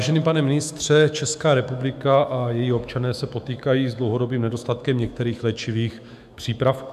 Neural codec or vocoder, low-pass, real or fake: autoencoder, 48 kHz, 128 numbers a frame, DAC-VAE, trained on Japanese speech; 14.4 kHz; fake